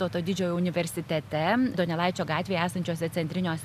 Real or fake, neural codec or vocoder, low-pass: real; none; 14.4 kHz